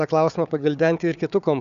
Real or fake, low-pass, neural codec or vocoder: fake; 7.2 kHz; codec, 16 kHz, 4 kbps, FunCodec, trained on Chinese and English, 50 frames a second